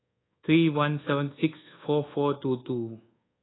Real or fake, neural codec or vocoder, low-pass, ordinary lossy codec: fake; codec, 24 kHz, 1.2 kbps, DualCodec; 7.2 kHz; AAC, 16 kbps